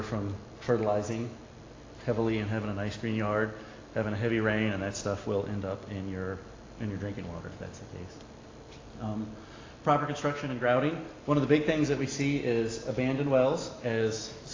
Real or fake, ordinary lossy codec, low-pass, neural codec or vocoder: real; AAC, 32 kbps; 7.2 kHz; none